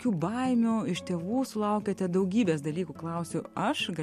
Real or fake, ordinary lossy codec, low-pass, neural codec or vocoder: real; MP3, 64 kbps; 14.4 kHz; none